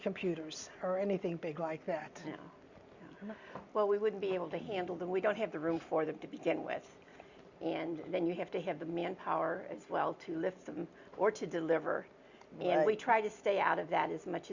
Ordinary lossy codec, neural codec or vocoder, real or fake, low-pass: Opus, 64 kbps; none; real; 7.2 kHz